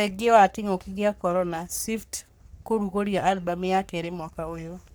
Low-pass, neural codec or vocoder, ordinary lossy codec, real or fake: none; codec, 44.1 kHz, 3.4 kbps, Pupu-Codec; none; fake